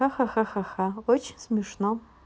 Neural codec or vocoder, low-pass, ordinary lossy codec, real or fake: none; none; none; real